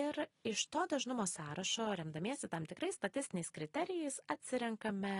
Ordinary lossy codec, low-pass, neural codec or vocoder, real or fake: AAC, 32 kbps; 10.8 kHz; none; real